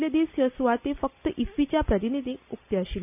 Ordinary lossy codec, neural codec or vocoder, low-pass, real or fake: none; none; 3.6 kHz; real